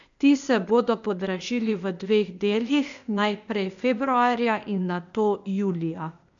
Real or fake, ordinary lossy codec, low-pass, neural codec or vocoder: fake; none; 7.2 kHz; codec, 16 kHz, 0.8 kbps, ZipCodec